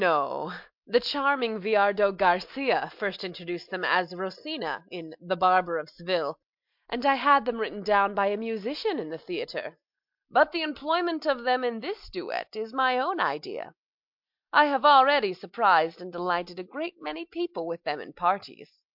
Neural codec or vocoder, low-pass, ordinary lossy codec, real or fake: none; 5.4 kHz; MP3, 48 kbps; real